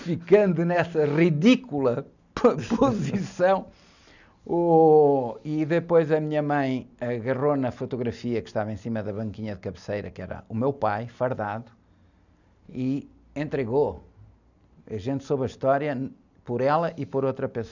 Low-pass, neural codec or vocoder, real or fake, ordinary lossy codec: 7.2 kHz; none; real; none